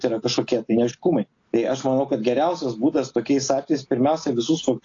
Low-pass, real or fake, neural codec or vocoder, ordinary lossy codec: 7.2 kHz; real; none; AAC, 48 kbps